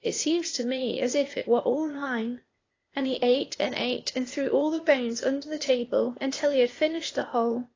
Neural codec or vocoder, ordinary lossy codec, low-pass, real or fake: codec, 16 kHz, 0.8 kbps, ZipCodec; AAC, 32 kbps; 7.2 kHz; fake